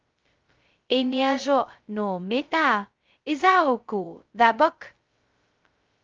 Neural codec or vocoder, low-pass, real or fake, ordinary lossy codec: codec, 16 kHz, 0.2 kbps, FocalCodec; 7.2 kHz; fake; Opus, 24 kbps